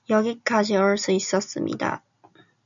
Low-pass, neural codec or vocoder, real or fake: 7.2 kHz; none; real